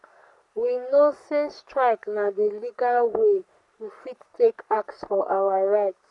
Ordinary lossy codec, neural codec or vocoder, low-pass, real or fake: none; codec, 44.1 kHz, 3.4 kbps, Pupu-Codec; 10.8 kHz; fake